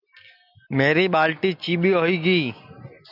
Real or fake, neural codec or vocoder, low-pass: real; none; 5.4 kHz